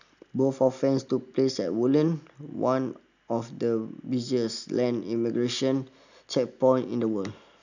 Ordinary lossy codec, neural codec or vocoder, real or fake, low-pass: none; none; real; 7.2 kHz